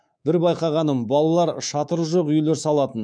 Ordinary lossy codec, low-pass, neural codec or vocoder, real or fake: none; 9.9 kHz; codec, 24 kHz, 3.1 kbps, DualCodec; fake